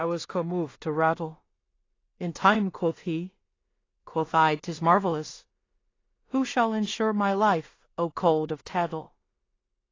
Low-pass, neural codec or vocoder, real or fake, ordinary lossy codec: 7.2 kHz; codec, 16 kHz in and 24 kHz out, 0.4 kbps, LongCat-Audio-Codec, two codebook decoder; fake; AAC, 32 kbps